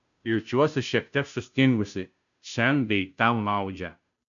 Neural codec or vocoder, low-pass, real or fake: codec, 16 kHz, 0.5 kbps, FunCodec, trained on Chinese and English, 25 frames a second; 7.2 kHz; fake